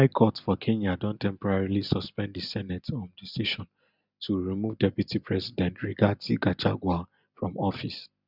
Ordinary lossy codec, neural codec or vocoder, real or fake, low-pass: none; none; real; 5.4 kHz